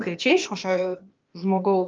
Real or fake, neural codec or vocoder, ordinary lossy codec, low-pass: fake; codec, 16 kHz, 0.8 kbps, ZipCodec; Opus, 24 kbps; 7.2 kHz